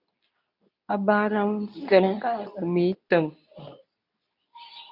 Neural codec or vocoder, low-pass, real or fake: codec, 24 kHz, 0.9 kbps, WavTokenizer, medium speech release version 2; 5.4 kHz; fake